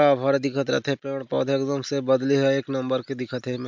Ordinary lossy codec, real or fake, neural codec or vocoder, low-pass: MP3, 64 kbps; real; none; 7.2 kHz